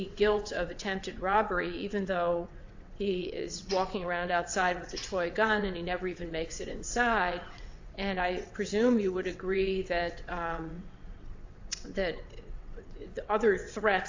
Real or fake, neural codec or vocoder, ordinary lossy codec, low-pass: fake; vocoder, 22.05 kHz, 80 mel bands, WaveNeXt; AAC, 48 kbps; 7.2 kHz